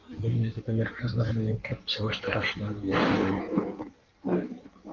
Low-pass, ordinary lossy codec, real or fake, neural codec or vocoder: 7.2 kHz; Opus, 32 kbps; fake; codec, 16 kHz in and 24 kHz out, 1.1 kbps, FireRedTTS-2 codec